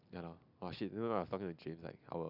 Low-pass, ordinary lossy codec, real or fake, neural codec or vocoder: 5.4 kHz; none; real; none